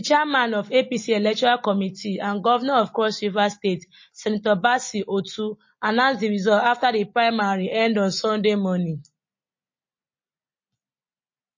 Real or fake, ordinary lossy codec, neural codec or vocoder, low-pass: real; MP3, 32 kbps; none; 7.2 kHz